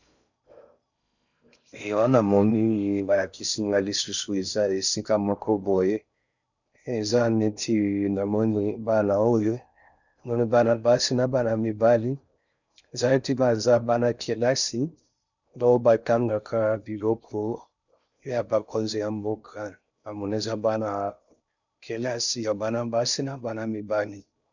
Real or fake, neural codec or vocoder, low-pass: fake; codec, 16 kHz in and 24 kHz out, 0.6 kbps, FocalCodec, streaming, 4096 codes; 7.2 kHz